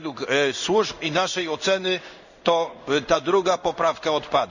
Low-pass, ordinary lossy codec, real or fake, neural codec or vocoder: 7.2 kHz; none; fake; codec, 16 kHz in and 24 kHz out, 1 kbps, XY-Tokenizer